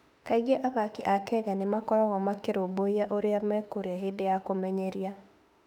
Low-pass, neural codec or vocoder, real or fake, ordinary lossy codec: 19.8 kHz; autoencoder, 48 kHz, 32 numbers a frame, DAC-VAE, trained on Japanese speech; fake; none